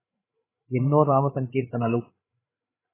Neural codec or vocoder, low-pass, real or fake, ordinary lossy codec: codec, 16 kHz, 8 kbps, FreqCodec, larger model; 3.6 kHz; fake; AAC, 16 kbps